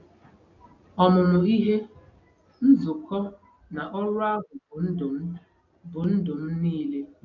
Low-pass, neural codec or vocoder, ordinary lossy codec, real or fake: 7.2 kHz; none; none; real